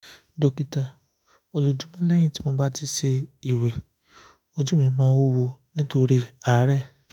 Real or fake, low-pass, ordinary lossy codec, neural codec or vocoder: fake; 19.8 kHz; none; autoencoder, 48 kHz, 32 numbers a frame, DAC-VAE, trained on Japanese speech